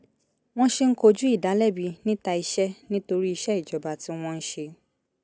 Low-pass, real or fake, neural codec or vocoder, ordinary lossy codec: none; real; none; none